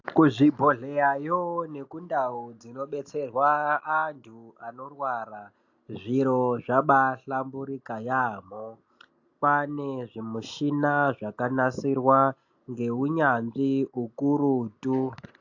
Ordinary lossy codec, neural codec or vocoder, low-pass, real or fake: AAC, 48 kbps; none; 7.2 kHz; real